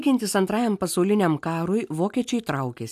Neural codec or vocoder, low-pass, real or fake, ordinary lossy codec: none; 14.4 kHz; real; AAC, 96 kbps